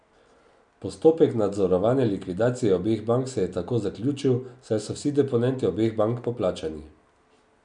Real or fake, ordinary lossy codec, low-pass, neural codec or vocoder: real; none; 9.9 kHz; none